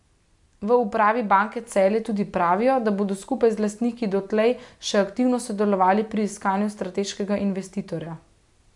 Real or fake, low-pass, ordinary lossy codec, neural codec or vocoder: real; 10.8 kHz; MP3, 64 kbps; none